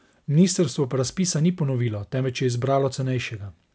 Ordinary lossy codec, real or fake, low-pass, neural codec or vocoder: none; real; none; none